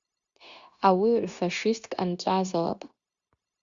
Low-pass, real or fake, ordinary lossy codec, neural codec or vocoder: 7.2 kHz; fake; Opus, 64 kbps; codec, 16 kHz, 0.9 kbps, LongCat-Audio-Codec